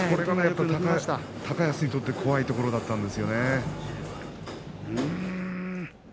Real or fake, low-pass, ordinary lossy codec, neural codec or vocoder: real; none; none; none